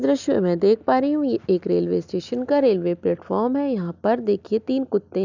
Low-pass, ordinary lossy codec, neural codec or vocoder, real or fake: 7.2 kHz; none; none; real